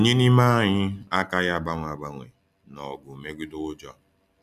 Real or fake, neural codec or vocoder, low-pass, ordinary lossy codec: real; none; 14.4 kHz; none